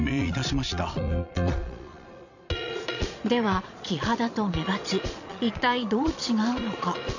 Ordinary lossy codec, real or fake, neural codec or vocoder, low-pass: none; fake; vocoder, 22.05 kHz, 80 mel bands, Vocos; 7.2 kHz